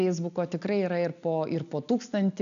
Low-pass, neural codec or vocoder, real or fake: 7.2 kHz; none; real